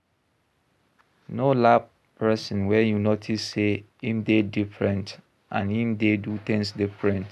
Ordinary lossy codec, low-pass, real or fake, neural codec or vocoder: none; none; real; none